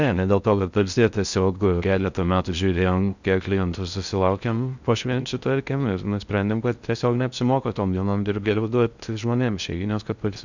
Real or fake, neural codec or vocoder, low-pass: fake; codec, 16 kHz in and 24 kHz out, 0.6 kbps, FocalCodec, streaming, 4096 codes; 7.2 kHz